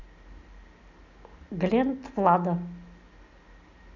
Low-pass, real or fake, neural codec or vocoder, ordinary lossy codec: 7.2 kHz; real; none; none